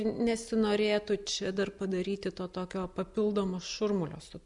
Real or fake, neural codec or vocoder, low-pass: real; none; 10.8 kHz